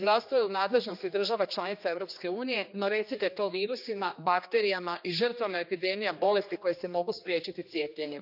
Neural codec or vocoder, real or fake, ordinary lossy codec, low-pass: codec, 16 kHz, 2 kbps, X-Codec, HuBERT features, trained on general audio; fake; none; 5.4 kHz